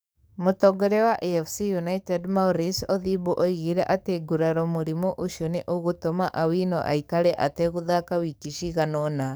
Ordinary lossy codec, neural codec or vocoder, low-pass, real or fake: none; codec, 44.1 kHz, 7.8 kbps, DAC; none; fake